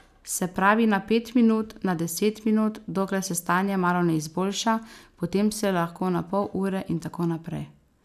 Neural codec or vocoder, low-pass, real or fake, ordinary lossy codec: none; 14.4 kHz; real; none